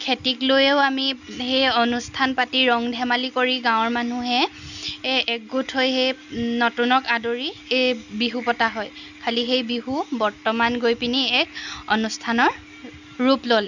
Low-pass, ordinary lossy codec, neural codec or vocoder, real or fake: 7.2 kHz; none; none; real